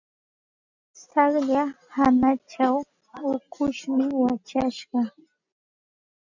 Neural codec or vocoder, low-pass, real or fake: none; 7.2 kHz; real